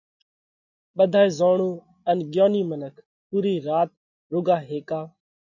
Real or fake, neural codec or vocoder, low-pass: real; none; 7.2 kHz